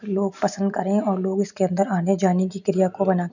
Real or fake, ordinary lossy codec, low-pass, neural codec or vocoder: real; none; 7.2 kHz; none